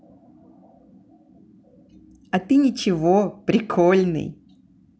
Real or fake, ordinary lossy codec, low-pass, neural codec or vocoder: real; none; none; none